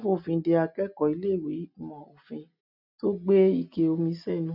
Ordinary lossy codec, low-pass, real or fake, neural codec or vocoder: none; 5.4 kHz; real; none